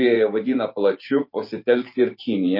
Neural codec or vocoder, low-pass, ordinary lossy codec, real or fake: vocoder, 44.1 kHz, 128 mel bands every 512 samples, BigVGAN v2; 5.4 kHz; MP3, 32 kbps; fake